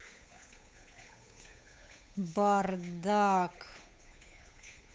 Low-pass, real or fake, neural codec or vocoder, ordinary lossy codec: none; fake; codec, 16 kHz, 8 kbps, FunCodec, trained on Chinese and English, 25 frames a second; none